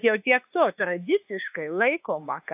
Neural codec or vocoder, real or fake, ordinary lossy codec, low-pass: autoencoder, 48 kHz, 32 numbers a frame, DAC-VAE, trained on Japanese speech; fake; AAC, 32 kbps; 3.6 kHz